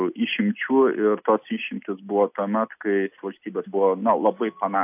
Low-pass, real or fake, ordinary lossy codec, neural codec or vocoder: 3.6 kHz; real; AAC, 32 kbps; none